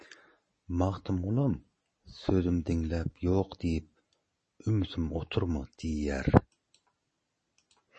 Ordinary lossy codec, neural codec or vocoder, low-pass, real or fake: MP3, 32 kbps; none; 10.8 kHz; real